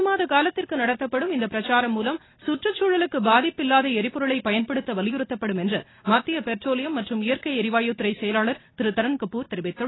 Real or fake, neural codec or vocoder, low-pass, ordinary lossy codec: real; none; 7.2 kHz; AAC, 16 kbps